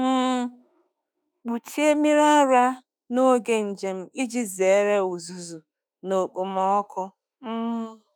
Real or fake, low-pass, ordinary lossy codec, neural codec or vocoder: fake; none; none; autoencoder, 48 kHz, 32 numbers a frame, DAC-VAE, trained on Japanese speech